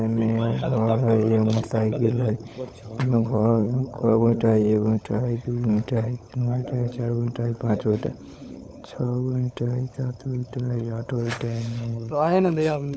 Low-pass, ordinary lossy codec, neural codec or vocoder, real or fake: none; none; codec, 16 kHz, 16 kbps, FunCodec, trained on LibriTTS, 50 frames a second; fake